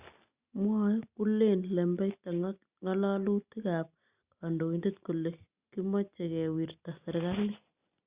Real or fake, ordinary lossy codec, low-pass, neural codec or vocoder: real; Opus, 64 kbps; 3.6 kHz; none